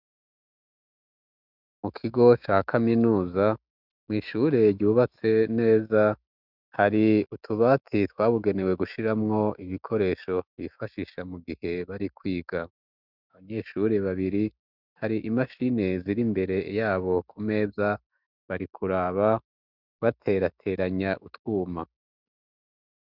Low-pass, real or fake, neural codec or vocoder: 5.4 kHz; fake; autoencoder, 48 kHz, 128 numbers a frame, DAC-VAE, trained on Japanese speech